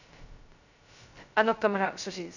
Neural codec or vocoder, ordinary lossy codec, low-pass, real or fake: codec, 16 kHz, 0.2 kbps, FocalCodec; none; 7.2 kHz; fake